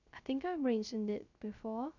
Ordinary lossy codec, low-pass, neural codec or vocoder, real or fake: none; 7.2 kHz; codec, 16 kHz, 0.3 kbps, FocalCodec; fake